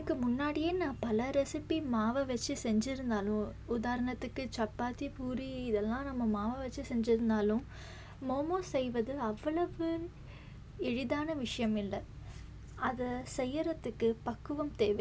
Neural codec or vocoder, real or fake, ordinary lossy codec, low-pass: none; real; none; none